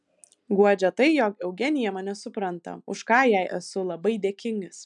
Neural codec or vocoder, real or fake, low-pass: none; real; 10.8 kHz